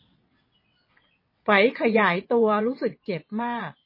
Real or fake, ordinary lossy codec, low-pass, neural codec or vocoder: fake; MP3, 24 kbps; 5.4 kHz; vocoder, 22.05 kHz, 80 mel bands, WaveNeXt